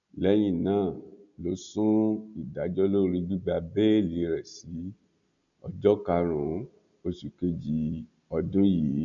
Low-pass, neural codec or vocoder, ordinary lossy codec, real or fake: 7.2 kHz; none; none; real